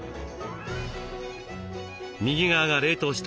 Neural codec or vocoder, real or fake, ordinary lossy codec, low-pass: none; real; none; none